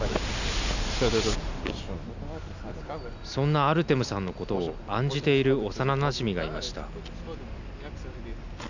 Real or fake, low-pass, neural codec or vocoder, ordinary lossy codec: real; 7.2 kHz; none; none